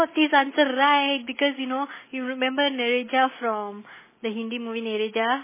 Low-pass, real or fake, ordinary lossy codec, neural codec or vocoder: 3.6 kHz; real; MP3, 16 kbps; none